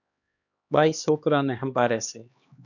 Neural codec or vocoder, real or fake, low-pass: codec, 16 kHz, 2 kbps, X-Codec, HuBERT features, trained on LibriSpeech; fake; 7.2 kHz